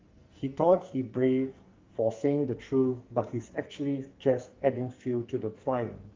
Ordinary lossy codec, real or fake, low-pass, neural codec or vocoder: Opus, 32 kbps; fake; 7.2 kHz; codec, 44.1 kHz, 2.6 kbps, SNAC